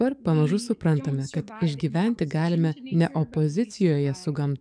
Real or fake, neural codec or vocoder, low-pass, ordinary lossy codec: fake; codec, 44.1 kHz, 7.8 kbps, DAC; 9.9 kHz; MP3, 96 kbps